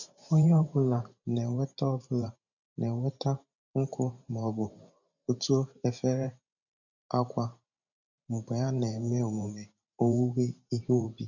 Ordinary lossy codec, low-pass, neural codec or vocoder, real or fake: none; 7.2 kHz; vocoder, 44.1 kHz, 128 mel bands every 512 samples, BigVGAN v2; fake